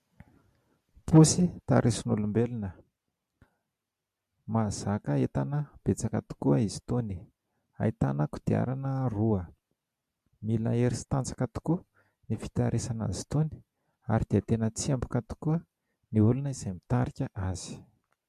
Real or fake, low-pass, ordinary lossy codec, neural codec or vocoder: real; 14.4 kHz; MP3, 64 kbps; none